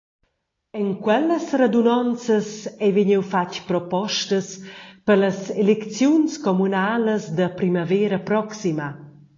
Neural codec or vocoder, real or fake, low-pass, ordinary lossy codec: none; real; 7.2 kHz; AAC, 32 kbps